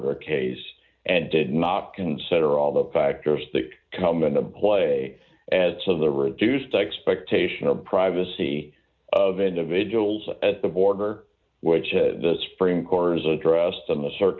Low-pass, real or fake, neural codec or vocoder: 7.2 kHz; real; none